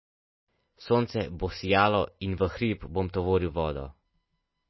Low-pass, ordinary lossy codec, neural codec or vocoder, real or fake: 7.2 kHz; MP3, 24 kbps; none; real